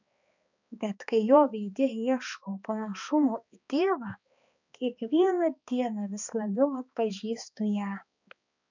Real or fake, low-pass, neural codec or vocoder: fake; 7.2 kHz; codec, 16 kHz, 2 kbps, X-Codec, HuBERT features, trained on balanced general audio